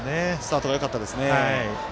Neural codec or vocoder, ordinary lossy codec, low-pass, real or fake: none; none; none; real